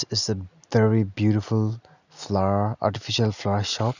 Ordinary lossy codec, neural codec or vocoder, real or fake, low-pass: none; none; real; 7.2 kHz